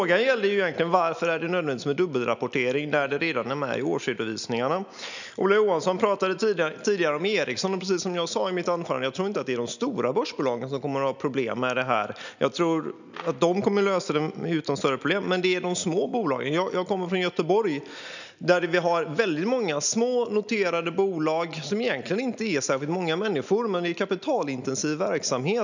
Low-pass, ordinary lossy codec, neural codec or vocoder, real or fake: 7.2 kHz; none; none; real